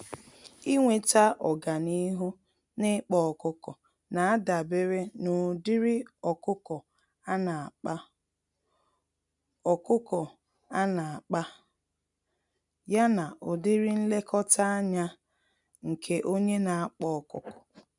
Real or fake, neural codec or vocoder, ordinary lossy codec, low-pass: real; none; none; 10.8 kHz